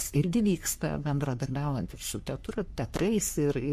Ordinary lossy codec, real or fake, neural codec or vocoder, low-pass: MP3, 64 kbps; fake; codec, 44.1 kHz, 3.4 kbps, Pupu-Codec; 14.4 kHz